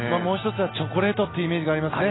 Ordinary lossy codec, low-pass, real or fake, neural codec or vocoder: AAC, 16 kbps; 7.2 kHz; real; none